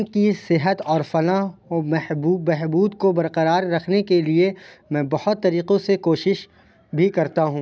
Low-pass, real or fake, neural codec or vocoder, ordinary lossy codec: none; real; none; none